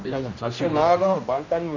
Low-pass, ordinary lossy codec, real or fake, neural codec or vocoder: 7.2 kHz; none; fake; codec, 16 kHz, 1 kbps, X-Codec, HuBERT features, trained on general audio